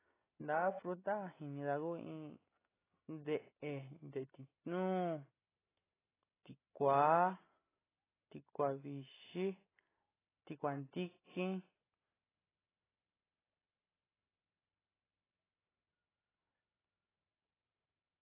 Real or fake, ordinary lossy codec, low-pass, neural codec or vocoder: real; AAC, 16 kbps; 3.6 kHz; none